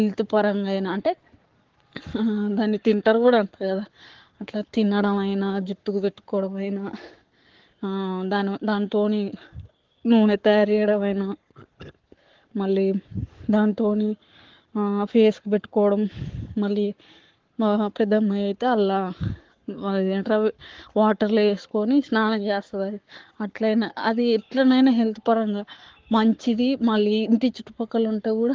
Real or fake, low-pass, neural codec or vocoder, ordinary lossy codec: fake; 7.2 kHz; codec, 44.1 kHz, 7.8 kbps, Pupu-Codec; Opus, 16 kbps